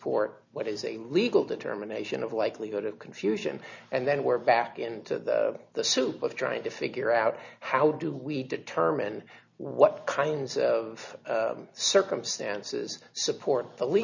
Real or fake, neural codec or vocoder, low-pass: real; none; 7.2 kHz